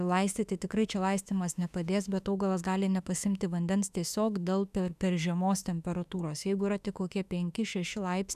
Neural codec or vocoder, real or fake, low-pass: autoencoder, 48 kHz, 32 numbers a frame, DAC-VAE, trained on Japanese speech; fake; 14.4 kHz